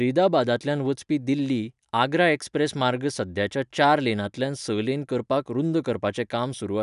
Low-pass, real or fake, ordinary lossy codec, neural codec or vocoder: 10.8 kHz; real; none; none